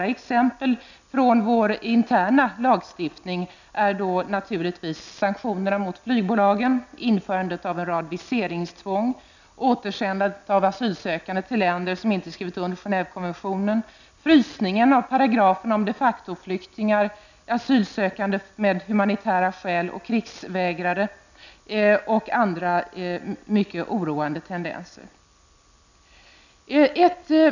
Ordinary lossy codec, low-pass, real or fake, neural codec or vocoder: none; 7.2 kHz; real; none